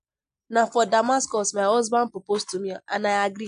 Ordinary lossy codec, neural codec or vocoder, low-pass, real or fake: MP3, 64 kbps; none; 9.9 kHz; real